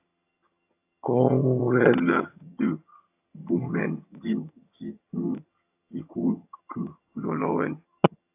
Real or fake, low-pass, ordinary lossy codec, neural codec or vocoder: fake; 3.6 kHz; AAC, 32 kbps; vocoder, 22.05 kHz, 80 mel bands, HiFi-GAN